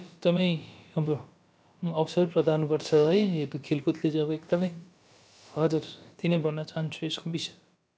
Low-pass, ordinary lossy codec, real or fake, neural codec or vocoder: none; none; fake; codec, 16 kHz, about 1 kbps, DyCAST, with the encoder's durations